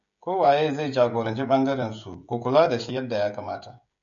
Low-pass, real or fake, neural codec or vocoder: 7.2 kHz; fake; codec, 16 kHz, 16 kbps, FreqCodec, smaller model